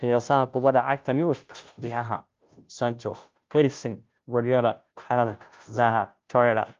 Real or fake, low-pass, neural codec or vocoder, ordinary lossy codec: fake; 7.2 kHz; codec, 16 kHz, 0.5 kbps, FunCodec, trained on Chinese and English, 25 frames a second; Opus, 32 kbps